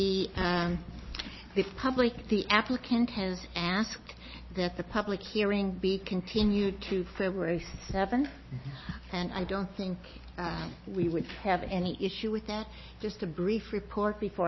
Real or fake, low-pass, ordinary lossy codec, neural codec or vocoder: real; 7.2 kHz; MP3, 24 kbps; none